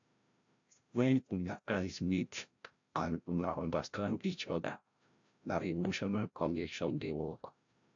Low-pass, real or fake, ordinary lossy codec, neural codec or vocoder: 7.2 kHz; fake; AAC, 64 kbps; codec, 16 kHz, 0.5 kbps, FreqCodec, larger model